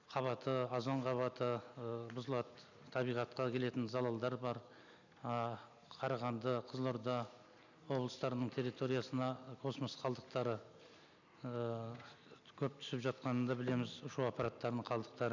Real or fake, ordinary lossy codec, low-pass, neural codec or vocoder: real; none; 7.2 kHz; none